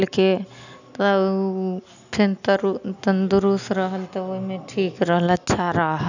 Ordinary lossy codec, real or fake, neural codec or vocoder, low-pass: none; real; none; 7.2 kHz